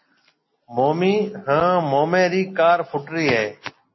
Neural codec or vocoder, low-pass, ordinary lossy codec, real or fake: none; 7.2 kHz; MP3, 24 kbps; real